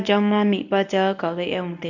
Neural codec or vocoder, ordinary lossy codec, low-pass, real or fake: codec, 24 kHz, 0.9 kbps, WavTokenizer, medium speech release version 1; none; 7.2 kHz; fake